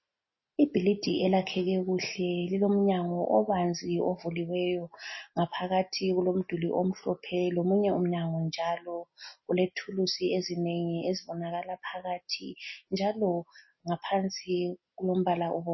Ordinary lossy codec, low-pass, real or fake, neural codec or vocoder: MP3, 24 kbps; 7.2 kHz; real; none